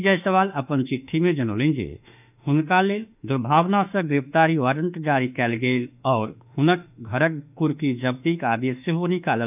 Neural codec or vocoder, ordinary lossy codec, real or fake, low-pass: autoencoder, 48 kHz, 32 numbers a frame, DAC-VAE, trained on Japanese speech; none; fake; 3.6 kHz